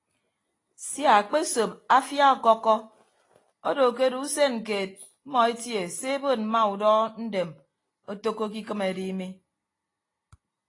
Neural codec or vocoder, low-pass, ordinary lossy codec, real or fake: none; 10.8 kHz; AAC, 32 kbps; real